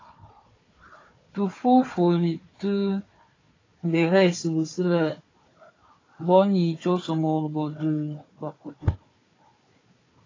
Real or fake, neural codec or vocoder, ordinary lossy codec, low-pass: fake; codec, 16 kHz, 4 kbps, FunCodec, trained on Chinese and English, 50 frames a second; AAC, 32 kbps; 7.2 kHz